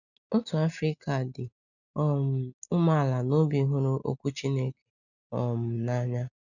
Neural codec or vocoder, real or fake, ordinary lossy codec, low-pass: none; real; none; 7.2 kHz